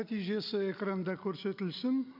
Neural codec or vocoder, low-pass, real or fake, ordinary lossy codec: none; 5.4 kHz; real; none